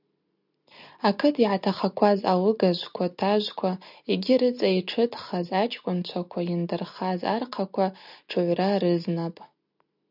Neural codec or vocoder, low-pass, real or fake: none; 5.4 kHz; real